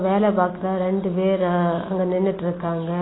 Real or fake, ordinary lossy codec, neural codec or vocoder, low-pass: real; AAC, 16 kbps; none; 7.2 kHz